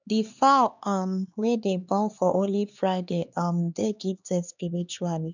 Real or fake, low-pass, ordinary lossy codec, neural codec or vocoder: fake; 7.2 kHz; none; codec, 16 kHz, 2 kbps, X-Codec, HuBERT features, trained on LibriSpeech